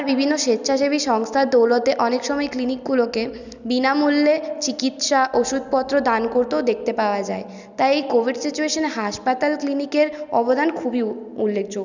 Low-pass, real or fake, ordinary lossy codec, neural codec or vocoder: 7.2 kHz; real; none; none